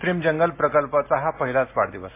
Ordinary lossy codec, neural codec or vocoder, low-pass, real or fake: MP3, 24 kbps; none; 3.6 kHz; real